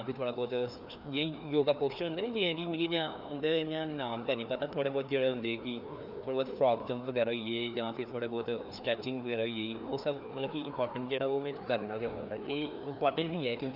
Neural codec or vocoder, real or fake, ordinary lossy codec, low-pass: codec, 16 kHz, 2 kbps, FreqCodec, larger model; fake; none; 5.4 kHz